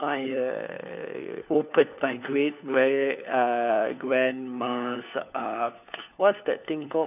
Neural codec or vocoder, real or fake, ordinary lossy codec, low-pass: codec, 16 kHz, 4 kbps, FunCodec, trained on LibriTTS, 50 frames a second; fake; none; 3.6 kHz